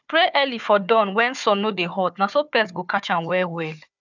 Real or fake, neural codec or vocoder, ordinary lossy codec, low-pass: fake; codec, 16 kHz, 4 kbps, FunCodec, trained on Chinese and English, 50 frames a second; none; 7.2 kHz